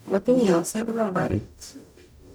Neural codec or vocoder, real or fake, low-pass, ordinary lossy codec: codec, 44.1 kHz, 0.9 kbps, DAC; fake; none; none